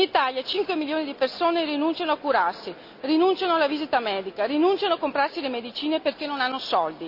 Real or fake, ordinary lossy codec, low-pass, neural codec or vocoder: real; none; 5.4 kHz; none